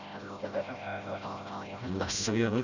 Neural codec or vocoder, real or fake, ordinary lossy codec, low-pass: codec, 16 kHz, 0.5 kbps, FreqCodec, smaller model; fake; none; 7.2 kHz